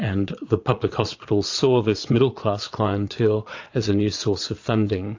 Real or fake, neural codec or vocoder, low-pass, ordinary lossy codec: fake; vocoder, 22.05 kHz, 80 mel bands, Vocos; 7.2 kHz; AAC, 48 kbps